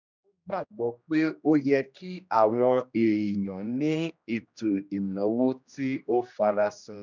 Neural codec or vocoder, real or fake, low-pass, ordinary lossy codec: codec, 16 kHz, 1 kbps, X-Codec, HuBERT features, trained on general audio; fake; 7.2 kHz; none